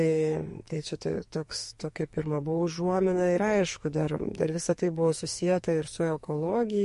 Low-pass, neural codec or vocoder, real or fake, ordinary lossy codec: 14.4 kHz; codec, 44.1 kHz, 2.6 kbps, SNAC; fake; MP3, 48 kbps